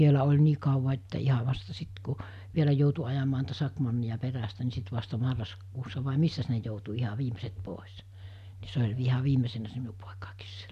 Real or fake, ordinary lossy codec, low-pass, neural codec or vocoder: real; none; 14.4 kHz; none